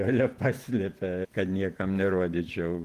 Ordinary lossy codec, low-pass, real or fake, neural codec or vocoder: Opus, 24 kbps; 14.4 kHz; fake; vocoder, 48 kHz, 128 mel bands, Vocos